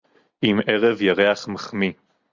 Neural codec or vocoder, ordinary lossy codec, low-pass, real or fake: none; Opus, 64 kbps; 7.2 kHz; real